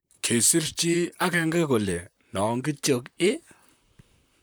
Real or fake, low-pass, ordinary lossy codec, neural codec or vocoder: fake; none; none; vocoder, 44.1 kHz, 128 mel bands, Pupu-Vocoder